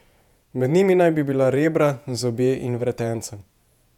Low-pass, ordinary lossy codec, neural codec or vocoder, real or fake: 19.8 kHz; none; vocoder, 48 kHz, 128 mel bands, Vocos; fake